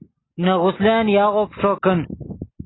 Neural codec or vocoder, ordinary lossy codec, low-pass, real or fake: none; AAC, 16 kbps; 7.2 kHz; real